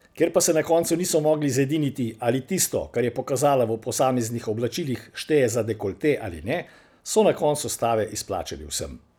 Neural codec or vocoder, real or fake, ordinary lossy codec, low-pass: none; real; none; none